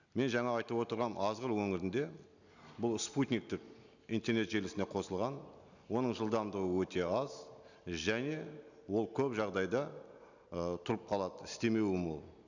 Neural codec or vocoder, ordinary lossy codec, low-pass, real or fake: none; none; 7.2 kHz; real